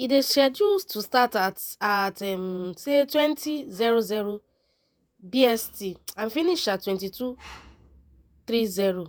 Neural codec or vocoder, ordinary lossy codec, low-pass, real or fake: vocoder, 48 kHz, 128 mel bands, Vocos; none; none; fake